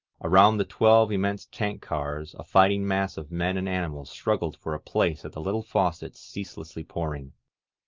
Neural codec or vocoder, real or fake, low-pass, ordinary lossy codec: none; real; 7.2 kHz; Opus, 24 kbps